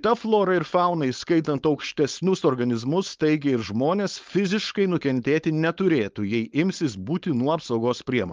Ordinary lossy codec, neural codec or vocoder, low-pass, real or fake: Opus, 32 kbps; codec, 16 kHz, 4.8 kbps, FACodec; 7.2 kHz; fake